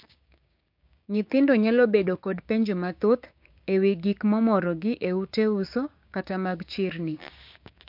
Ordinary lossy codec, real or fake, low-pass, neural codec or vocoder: MP3, 48 kbps; fake; 5.4 kHz; codec, 16 kHz, 6 kbps, DAC